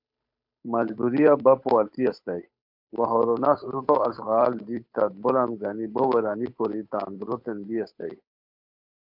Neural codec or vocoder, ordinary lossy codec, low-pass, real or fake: codec, 16 kHz, 8 kbps, FunCodec, trained on Chinese and English, 25 frames a second; MP3, 48 kbps; 5.4 kHz; fake